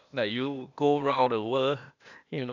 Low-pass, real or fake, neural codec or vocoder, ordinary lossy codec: 7.2 kHz; fake; codec, 16 kHz, 0.8 kbps, ZipCodec; none